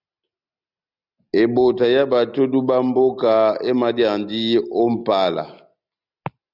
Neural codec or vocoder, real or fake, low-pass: none; real; 5.4 kHz